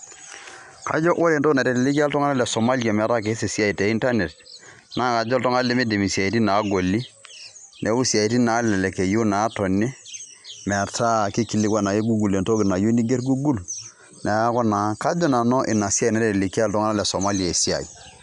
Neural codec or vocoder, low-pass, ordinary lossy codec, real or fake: none; 10.8 kHz; none; real